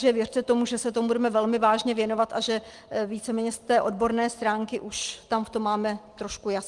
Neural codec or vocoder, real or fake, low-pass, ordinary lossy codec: none; real; 10.8 kHz; Opus, 24 kbps